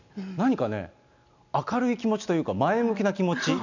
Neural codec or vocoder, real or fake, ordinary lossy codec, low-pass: none; real; none; 7.2 kHz